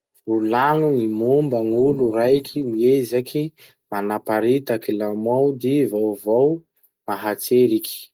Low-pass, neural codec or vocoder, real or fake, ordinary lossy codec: 19.8 kHz; none; real; Opus, 24 kbps